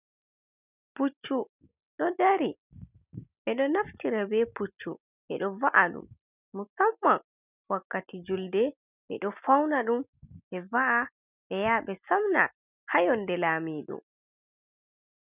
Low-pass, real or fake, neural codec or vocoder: 3.6 kHz; real; none